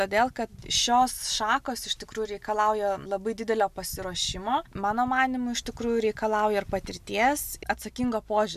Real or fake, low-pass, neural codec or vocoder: real; 14.4 kHz; none